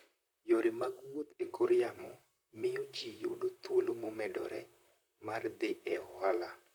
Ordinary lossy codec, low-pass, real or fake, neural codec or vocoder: none; none; fake; vocoder, 44.1 kHz, 128 mel bands, Pupu-Vocoder